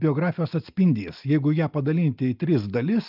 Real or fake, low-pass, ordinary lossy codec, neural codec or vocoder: real; 5.4 kHz; Opus, 24 kbps; none